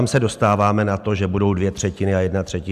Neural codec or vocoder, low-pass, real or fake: none; 14.4 kHz; real